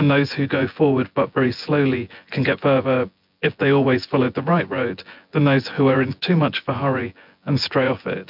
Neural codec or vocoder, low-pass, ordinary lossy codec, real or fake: vocoder, 24 kHz, 100 mel bands, Vocos; 5.4 kHz; MP3, 48 kbps; fake